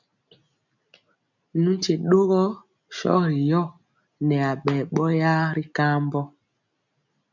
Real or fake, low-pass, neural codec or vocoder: real; 7.2 kHz; none